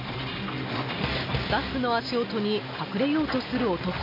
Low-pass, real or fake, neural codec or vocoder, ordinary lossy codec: 5.4 kHz; real; none; MP3, 32 kbps